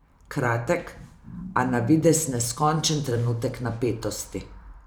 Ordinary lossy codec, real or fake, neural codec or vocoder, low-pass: none; fake; vocoder, 44.1 kHz, 128 mel bands every 512 samples, BigVGAN v2; none